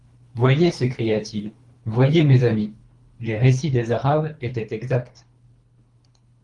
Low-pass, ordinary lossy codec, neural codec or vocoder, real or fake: 10.8 kHz; Opus, 32 kbps; codec, 24 kHz, 3 kbps, HILCodec; fake